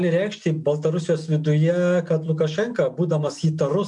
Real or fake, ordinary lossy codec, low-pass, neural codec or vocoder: real; MP3, 64 kbps; 10.8 kHz; none